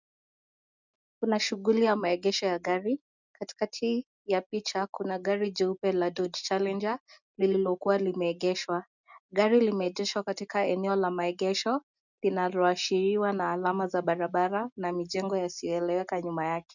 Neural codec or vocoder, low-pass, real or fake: none; 7.2 kHz; real